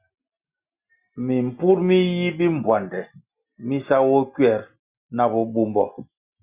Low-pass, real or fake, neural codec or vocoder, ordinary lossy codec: 3.6 kHz; real; none; Opus, 64 kbps